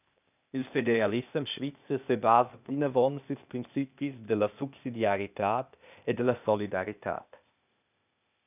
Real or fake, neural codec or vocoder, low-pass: fake; codec, 16 kHz, 0.8 kbps, ZipCodec; 3.6 kHz